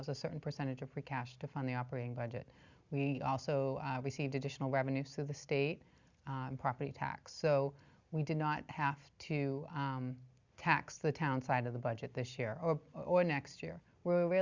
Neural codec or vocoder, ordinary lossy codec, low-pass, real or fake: none; Opus, 64 kbps; 7.2 kHz; real